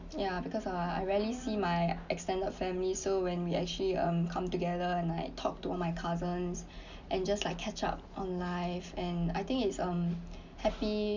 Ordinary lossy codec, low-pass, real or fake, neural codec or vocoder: none; 7.2 kHz; real; none